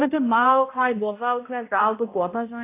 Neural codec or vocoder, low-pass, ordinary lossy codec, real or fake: codec, 16 kHz, 0.5 kbps, X-Codec, HuBERT features, trained on balanced general audio; 3.6 kHz; AAC, 24 kbps; fake